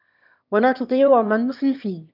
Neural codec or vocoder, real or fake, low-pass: autoencoder, 22.05 kHz, a latent of 192 numbers a frame, VITS, trained on one speaker; fake; 5.4 kHz